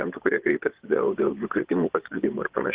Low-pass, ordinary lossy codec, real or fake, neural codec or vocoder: 3.6 kHz; Opus, 16 kbps; fake; vocoder, 24 kHz, 100 mel bands, Vocos